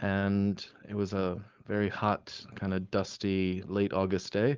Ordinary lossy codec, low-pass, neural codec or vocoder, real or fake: Opus, 32 kbps; 7.2 kHz; codec, 16 kHz, 4.8 kbps, FACodec; fake